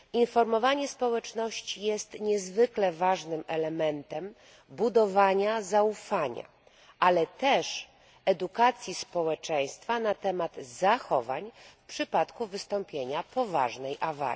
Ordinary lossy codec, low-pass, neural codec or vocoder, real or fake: none; none; none; real